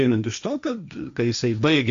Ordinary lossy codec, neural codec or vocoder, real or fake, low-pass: Opus, 64 kbps; codec, 16 kHz, 1.1 kbps, Voila-Tokenizer; fake; 7.2 kHz